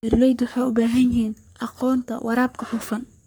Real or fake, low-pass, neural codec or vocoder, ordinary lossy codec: fake; none; codec, 44.1 kHz, 3.4 kbps, Pupu-Codec; none